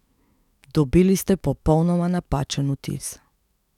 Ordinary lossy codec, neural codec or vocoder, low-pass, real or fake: none; autoencoder, 48 kHz, 128 numbers a frame, DAC-VAE, trained on Japanese speech; 19.8 kHz; fake